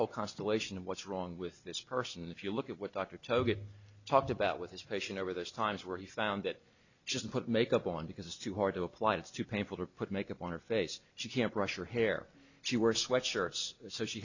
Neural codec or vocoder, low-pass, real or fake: none; 7.2 kHz; real